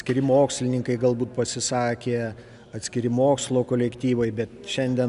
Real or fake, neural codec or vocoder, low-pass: real; none; 10.8 kHz